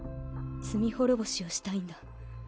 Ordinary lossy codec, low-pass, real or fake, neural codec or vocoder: none; none; real; none